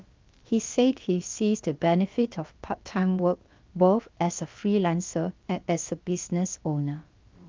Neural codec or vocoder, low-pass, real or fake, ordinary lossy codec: codec, 16 kHz, about 1 kbps, DyCAST, with the encoder's durations; 7.2 kHz; fake; Opus, 24 kbps